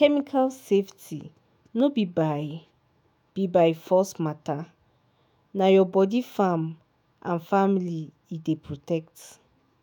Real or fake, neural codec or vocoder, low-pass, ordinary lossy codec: fake; autoencoder, 48 kHz, 128 numbers a frame, DAC-VAE, trained on Japanese speech; none; none